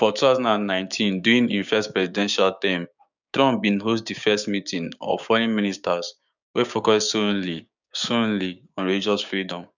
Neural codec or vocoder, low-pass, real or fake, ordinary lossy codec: codec, 16 kHz, 6 kbps, DAC; 7.2 kHz; fake; none